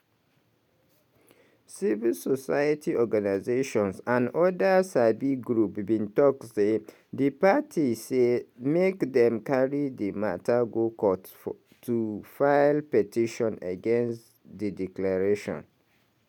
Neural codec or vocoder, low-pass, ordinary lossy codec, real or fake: none; 19.8 kHz; none; real